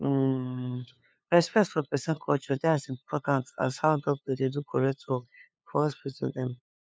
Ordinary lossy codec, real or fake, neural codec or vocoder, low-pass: none; fake; codec, 16 kHz, 2 kbps, FunCodec, trained on LibriTTS, 25 frames a second; none